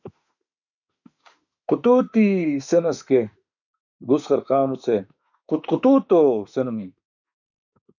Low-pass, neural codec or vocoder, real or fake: 7.2 kHz; autoencoder, 48 kHz, 32 numbers a frame, DAC-VAE, trained on Japanese speech; fake